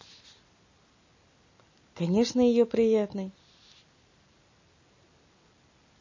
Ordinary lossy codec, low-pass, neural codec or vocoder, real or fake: MP3, 32 kbps; 7.2 kHz; none; real